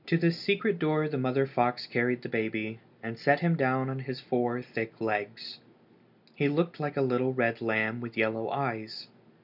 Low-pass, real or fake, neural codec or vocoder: 5.4 kHz; real; none